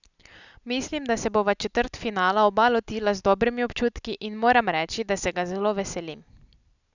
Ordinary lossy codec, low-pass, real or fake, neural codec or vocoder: none; 7.2 kHz; real; none